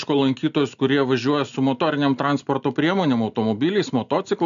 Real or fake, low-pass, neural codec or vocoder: real; 7.2 kHz; none